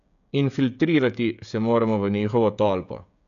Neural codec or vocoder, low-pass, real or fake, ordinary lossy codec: codec, 16 kHz, 16 kbps, FreqCodec, smaller model; 7.2 kHz; fake; none